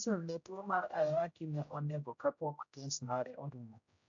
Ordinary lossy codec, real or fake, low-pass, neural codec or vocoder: AAC, 64 kbps; fake; 7.2 kHz; codec, 16 kHz, 0.5 kbps, X-Codec, HuBERT features, trained on general audio